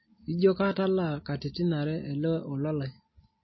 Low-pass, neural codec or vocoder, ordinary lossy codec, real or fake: 7.2 kHz; none; MP3, 24 kbps; real